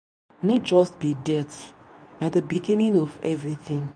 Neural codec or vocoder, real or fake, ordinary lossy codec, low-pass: codec, 24 kHz, 0.9 kbps, WavTokenizer, medium speech release version 2; fake; MP3, 96 kbps; 9.9 kHz